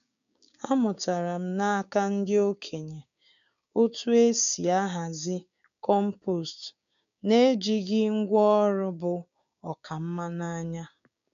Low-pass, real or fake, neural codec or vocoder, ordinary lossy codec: 7.2 kHz; fake; codec, 16 kHz, 6 kbps, DAC; none